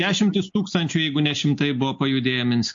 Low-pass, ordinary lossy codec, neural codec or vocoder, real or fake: 7.2 kHz; MP3, 48 kbps; none; real